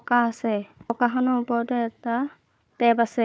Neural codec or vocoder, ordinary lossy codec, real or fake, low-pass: codec, 16 kHz, 4 kbps, FunCodec, trained on Chinese and English, 50 frames a second; none; fake; none